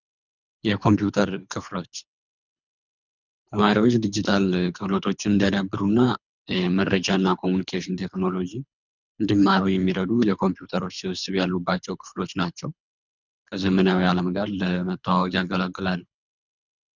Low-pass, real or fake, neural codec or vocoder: 7.2 kHz; fake; codec, 24 kHz, 3 kbps, HILCodec